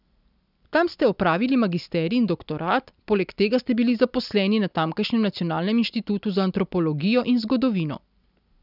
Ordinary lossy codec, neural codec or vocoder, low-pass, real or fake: none; none; 5.4 kHz; real